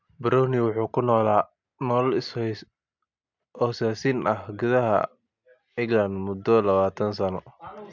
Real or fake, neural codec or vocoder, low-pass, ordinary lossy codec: real; none; 7.2 kHz; MP3, 64 kbps